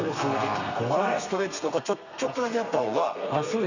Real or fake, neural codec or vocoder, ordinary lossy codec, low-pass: fake; codec, 32 kHz, 1.9 kbps, SNAC; none; 7.2 kHz